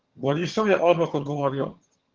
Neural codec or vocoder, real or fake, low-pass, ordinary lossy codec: vocoder, 22.05 kHz, 80 mel bands, HiFi-GAN; fake; 7.2 kHz; Opus, 16 kbps